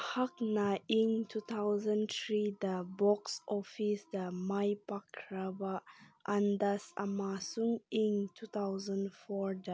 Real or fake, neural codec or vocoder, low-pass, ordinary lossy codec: real; none; none; none